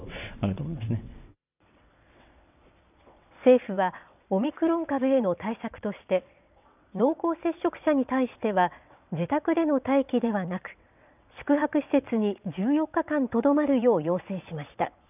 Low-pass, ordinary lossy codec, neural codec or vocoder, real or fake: 3.6 kHz; none; vocoder, 22.05 kHz, 80 mel bands, WaveNeXt; fake